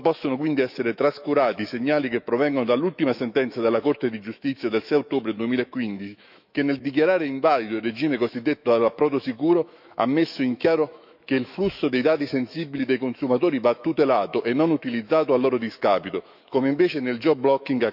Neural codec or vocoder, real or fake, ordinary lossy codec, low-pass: autoencoder, 48 kHz, 128 numbers a frame, DAC-VAE, trained on Japanese speech; fake; none; 5.4 kHz